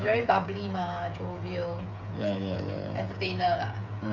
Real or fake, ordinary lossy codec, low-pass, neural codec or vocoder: fake; none; 7.2 kHz; codec, 16 kHz, 8 kbps, FreqCodec, smaller model